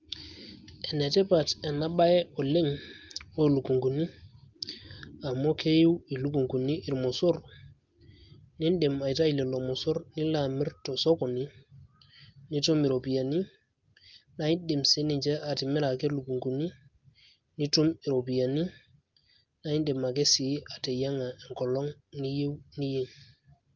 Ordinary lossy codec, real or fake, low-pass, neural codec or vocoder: Opus, 32 kbps; real; 7.2 kHz; none